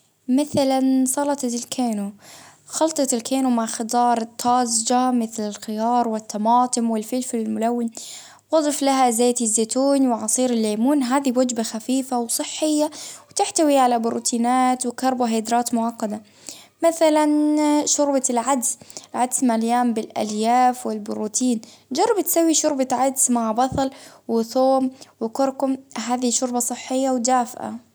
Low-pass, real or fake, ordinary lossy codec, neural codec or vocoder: none; real; none; none